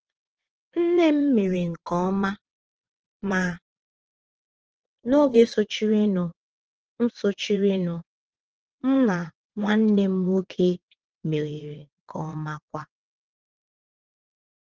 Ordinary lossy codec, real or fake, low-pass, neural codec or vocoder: Opus, 16 kbps; fake; 7.2 kHz; vocoder, 22.05 kHz, 80 mel bands, WaveNeXt